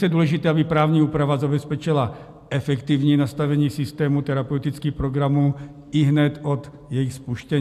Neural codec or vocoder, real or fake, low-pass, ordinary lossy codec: none; real; 14.4 kHz; AAC, 96 kbps